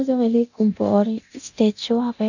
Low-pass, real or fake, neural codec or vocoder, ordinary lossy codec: 7.2 kHz; fake; codec, 24 kHz, 0.9 kbps, DualCodec; none